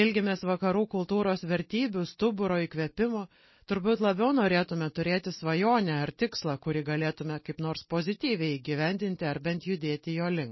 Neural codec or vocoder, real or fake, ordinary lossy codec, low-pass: none; real; MP3, 24 kbps; 7.2 kHz